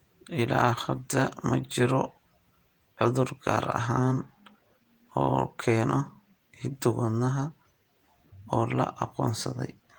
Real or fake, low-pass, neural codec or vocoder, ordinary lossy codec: fake; 19.8 kHz; vocoder, 48 kHz, 128 mel bands, Vocos; Opus, 24 kbps